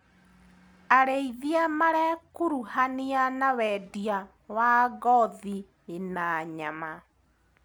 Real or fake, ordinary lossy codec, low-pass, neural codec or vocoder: real; none; none; none